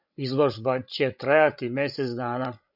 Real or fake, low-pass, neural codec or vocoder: fake; 5.4 kHz; codec, 16 kHz, 16 kbps, FreqCodec, larger model